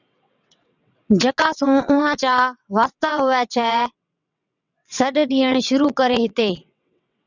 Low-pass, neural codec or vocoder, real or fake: 7.2 kHz; vocoder, 22.05 kHz, 80 mel bands, WaveNeXt; fake